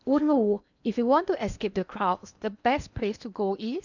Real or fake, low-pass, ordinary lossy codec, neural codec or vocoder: fake; 7.2 kHz; none; codec, 16 kHz in and 24 kHz out, 0.8 kbps, FocalCodec, streaming, 65536 codes